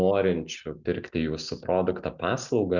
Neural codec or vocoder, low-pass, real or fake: none; 7.2 kHz; real